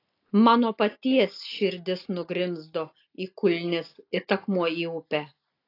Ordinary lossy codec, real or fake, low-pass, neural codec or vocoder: AAC, 32 kbps; real; 5.4 kHz; none